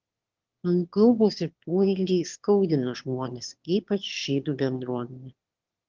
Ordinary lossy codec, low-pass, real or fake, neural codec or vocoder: Opus, 16 kbps; 7.2 kHz; fake; autoencoder, 22.05 kHz, a latent of 192 numbers a frame, VITS, trained on one speaker